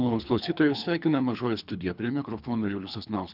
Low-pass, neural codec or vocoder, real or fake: 5.4 kHz; codec, 24 kHz, 3 kbps, HILCodec; fake